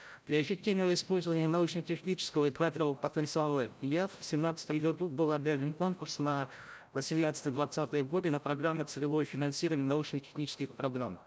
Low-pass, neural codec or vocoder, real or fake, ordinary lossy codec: none; codec, 16 kHz, 0.5 kbps, FreqCodec, larger model; fake; none